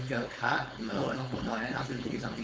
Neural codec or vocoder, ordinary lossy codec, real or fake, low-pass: codec, 16 kHz, 4.8 kbps, FACodec; none; fake; none